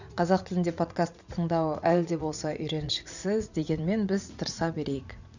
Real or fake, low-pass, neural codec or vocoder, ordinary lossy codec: fake; 7.2 kHz; vocoder, 44.1 kHz, 80 mel bands, Vocos; none